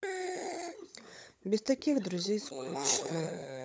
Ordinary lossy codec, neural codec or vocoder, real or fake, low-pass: none; codec, 16 kHz, 16 kbps, FunCodec, trained on LibriTTS, 50 frames a second; fake; none